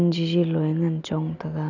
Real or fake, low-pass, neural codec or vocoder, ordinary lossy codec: real; 7.2 kHz; none; none